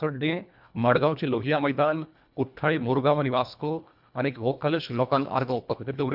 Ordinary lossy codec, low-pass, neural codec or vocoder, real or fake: none; 5.4 kHz; codec, 24 kHz, 1.5 kbps, HILCodec; fake